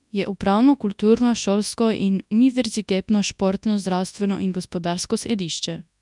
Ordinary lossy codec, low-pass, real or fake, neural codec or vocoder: none; 10.8 kHz; fake; codec, 24 kHz, 0.9 kbps, WavTokenizer, large speech release